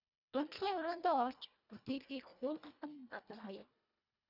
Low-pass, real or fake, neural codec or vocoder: 5.4 kHz; fake; codec, 24 kHz, 1.5 kbps, HILCodec